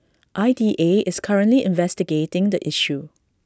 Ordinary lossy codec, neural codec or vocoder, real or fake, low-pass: none; none; real; none